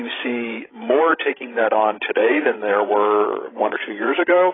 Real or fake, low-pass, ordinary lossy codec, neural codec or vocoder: fake; 7.2 kHz; AAC, 16 kbps; codec, 16 kHz, 16 kbps, FreqCodec, larger model